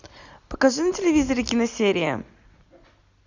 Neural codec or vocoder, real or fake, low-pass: none; real; 7.2 kHz